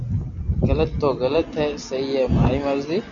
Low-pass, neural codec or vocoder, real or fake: 7.2 kHz; none; real